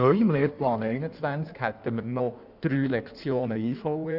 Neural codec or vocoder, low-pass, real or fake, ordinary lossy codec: codec, 16 kHz in and 24 kHz out, 1.1 kbps, FireRedTTS-2 codec; 5.4 kHz; fake; none